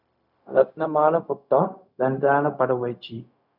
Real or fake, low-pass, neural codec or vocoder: fake; 5.4 kHz; codec, 16 kHz, 0.4 kbps, LongCat-Audio-Codec